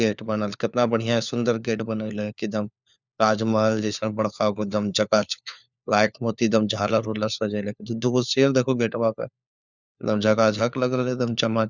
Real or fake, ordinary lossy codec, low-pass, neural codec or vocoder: fake; none; 7.2 kHz; codec, 16 kHz, 4 kbps, FunCodec, trained on LibriTTS, 50 frames a second